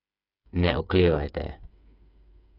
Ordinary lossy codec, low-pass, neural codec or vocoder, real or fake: none; 5.4 kHz; codec, 16 kHz, 8 kbps, FreqCodec, smaller model; fake